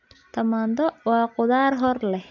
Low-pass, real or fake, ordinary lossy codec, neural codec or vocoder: 7.2 kHz; real; none; none